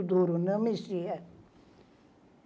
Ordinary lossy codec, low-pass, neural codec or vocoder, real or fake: none; none; none; real